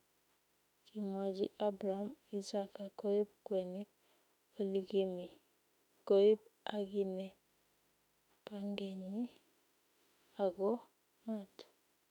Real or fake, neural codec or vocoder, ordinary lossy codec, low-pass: fake; autoencoder, 48 kHz, 32 numbers a frame, DAC-VAE, trained on Japanese speech; none; 19.8 kHz